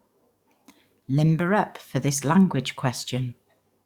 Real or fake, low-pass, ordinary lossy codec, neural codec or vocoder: fake; 19.8 kHz; Opus, 64 kbps; codec, 44.1 kHz, 7.8 kbps, DAC